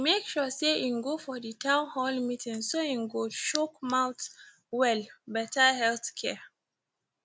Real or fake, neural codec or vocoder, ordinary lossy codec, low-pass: real; none; none; none